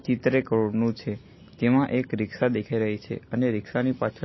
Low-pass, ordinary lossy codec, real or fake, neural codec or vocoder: 7.2 kHz; MP3, 24 kbps; real; none